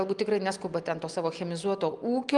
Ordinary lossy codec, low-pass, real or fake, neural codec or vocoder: Opus, 32 kbps; 10.8 kHz; real; none